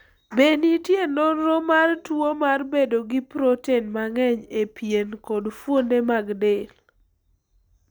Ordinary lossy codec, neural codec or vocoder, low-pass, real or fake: none; none; none; real